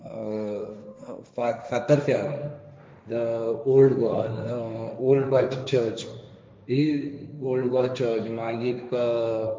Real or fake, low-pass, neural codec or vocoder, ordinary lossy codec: fake; none; codec, 16 kHz, 1.1 kbps, Voila-Tokenizer; none